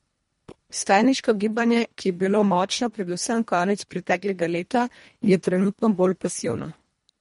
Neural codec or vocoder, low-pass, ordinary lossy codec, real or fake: codec, 24 kHz, 1.5 kbps, HILCodec; 10.8 kHz; MP3, 48 kbps; fake